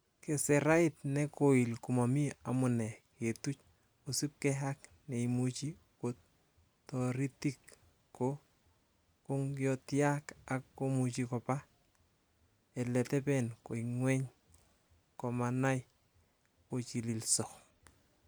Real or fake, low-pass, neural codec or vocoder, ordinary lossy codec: real; none; none; none